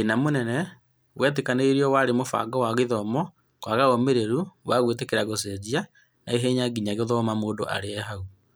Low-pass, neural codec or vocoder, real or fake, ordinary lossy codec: none; none; real; none